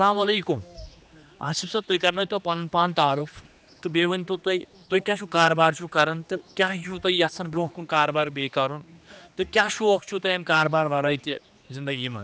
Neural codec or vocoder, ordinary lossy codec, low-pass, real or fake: codec, 16 kHz, 2 kbps, X-Codec, HuBERT features, trained on general audio; none; none; fake